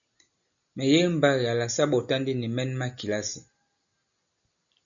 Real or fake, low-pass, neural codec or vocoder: real; 7.2 kHz; none